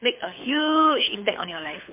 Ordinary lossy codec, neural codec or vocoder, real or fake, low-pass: MP3, 24 kbps; codec, 24 kHz, 6 kbps, HILCodec; fake; 3.6 kHz